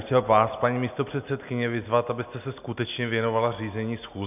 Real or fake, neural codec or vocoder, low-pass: real; none; 3.6 kHz